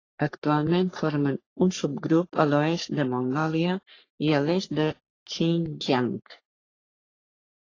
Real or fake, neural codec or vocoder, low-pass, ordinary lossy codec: fake; codec, 44.1 kHz, 3.4 kbps, Pupu-Codec; 7.2 kHz; AAC, 32 kbps